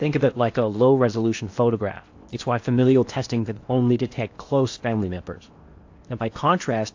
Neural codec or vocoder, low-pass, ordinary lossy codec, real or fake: codec, 16 kHz in and 24 kHz out, 0.8 kbps, FocalCodec, streaming, 65536 codes; 7.2 kHz; AAC, 48 kbps; fake